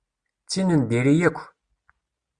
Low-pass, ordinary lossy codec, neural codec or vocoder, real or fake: 9.9 kHz; Opus, 64 kbps; none; real